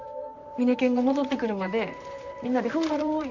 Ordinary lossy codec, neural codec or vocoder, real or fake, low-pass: none; vocoder, 44.1 kHz, 128 mel bands, Pupu-Vocoder; fake; 7.2 kHz